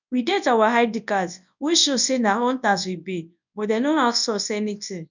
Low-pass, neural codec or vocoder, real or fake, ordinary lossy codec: 7.2 kHz; codec, 24 kHz, 0.9 kbps, WavTokenizer, large speech release; fake; none